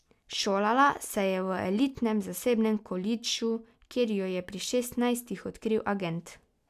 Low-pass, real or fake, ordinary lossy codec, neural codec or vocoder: 14.4 kHz; real; none; none